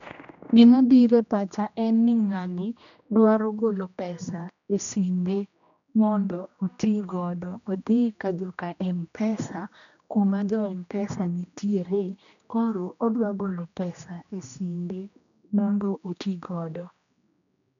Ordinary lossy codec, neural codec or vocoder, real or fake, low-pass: none; codec, 16 kHz, 1 kbps, X-Codec, HuBERT features, trained on general audio; fake; 7.2 kHz